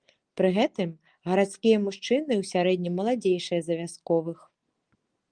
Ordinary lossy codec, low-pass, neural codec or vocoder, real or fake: Opus, 24 kbps; 9.9 kHz; none; real